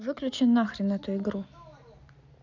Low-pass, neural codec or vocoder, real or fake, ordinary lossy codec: 7.2 kHz; none; real; none